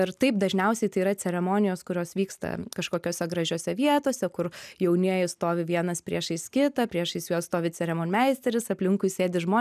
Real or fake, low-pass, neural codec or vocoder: real; 14.4 kHz; none